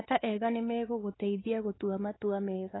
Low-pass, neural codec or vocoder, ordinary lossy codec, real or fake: 7.2 kHz; codec, 16 kHz, 4 kbps, X-Codec, WavLM features, trained on Multilingual LibriSpeech; AAC, 16 kbps; fake